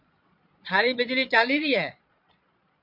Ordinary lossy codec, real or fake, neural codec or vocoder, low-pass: MP3, 48 kbps; fake; vocoder, 22.05 kHz, 80 mel bands, Vocos; 5.4 kHz